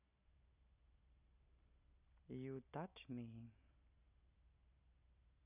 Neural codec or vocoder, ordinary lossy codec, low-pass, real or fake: none; none; 3.6 kHz; real